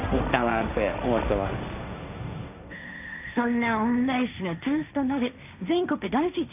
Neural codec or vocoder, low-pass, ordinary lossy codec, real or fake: codec, 16 kHz, 1.1 kbps, Voila-Tokenizer; 3.6 kHz; none; fake